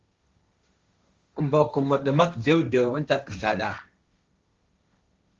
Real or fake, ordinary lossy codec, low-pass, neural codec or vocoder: fake; Opus, 32 kbps; 7.2 kHz; codec, 16 kHz, 1.1 kbps, Voila-Tokenizer